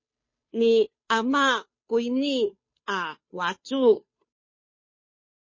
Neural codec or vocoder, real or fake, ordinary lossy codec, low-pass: codec, 16 kHz, 2 kbps, FunCodec, trained on Chinese and English, 25 frames a second; fake; MP3, 32 kbps; 7.2 kHz